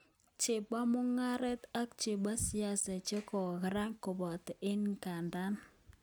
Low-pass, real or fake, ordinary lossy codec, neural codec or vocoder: none; real; none; none